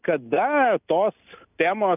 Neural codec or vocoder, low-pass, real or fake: none; 3.6 kHz; real